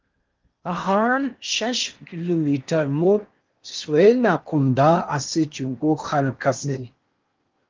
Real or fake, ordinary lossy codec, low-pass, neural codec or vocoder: fake; Opus, 24 kbps; 7.2 kHz; codec, 16 kHz in and 24 kHz out, 0.8 kbps, FocalCodec, streaming, 65536 codes